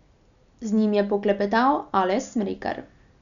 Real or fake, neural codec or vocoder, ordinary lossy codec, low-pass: real; none; none; 7.2 kHz